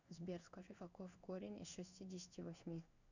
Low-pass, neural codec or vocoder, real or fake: 7.2 kHz; codec, 16 kHz in and 24 kHz out, 1 kbps, XY-Tokenizer; fake